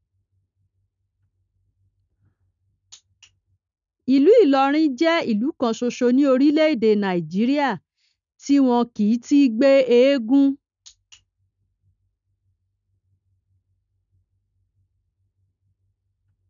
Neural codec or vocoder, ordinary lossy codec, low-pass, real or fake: none; none; 7.2 kHz; real